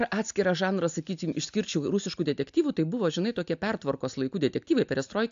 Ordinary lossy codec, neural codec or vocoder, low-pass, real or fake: AAC, 64 kbps; none; 7.2 kHz; real